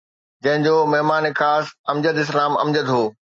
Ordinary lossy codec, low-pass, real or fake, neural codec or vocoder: MP3, 32 kbps; 10.8 kHz; real; none